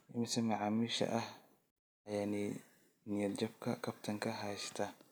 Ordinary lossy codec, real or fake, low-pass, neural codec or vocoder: none; real; none; none